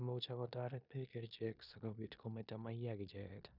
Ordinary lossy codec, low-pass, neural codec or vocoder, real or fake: none; 5.4 kHz; codec, 16 kHz in and 24 kHz out, 0.9 kbps, LongCat-Audio-Codec, four codebook decoder; fake